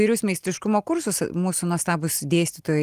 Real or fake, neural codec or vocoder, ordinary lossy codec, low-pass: real; none; Opus, 24 kbps; 14.4 kHz